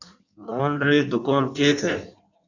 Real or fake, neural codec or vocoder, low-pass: fake; codec, 16 kHz in and 24 kHz out, 1.1 kbps, FireRedTTS-2 codec; 7.2 kHz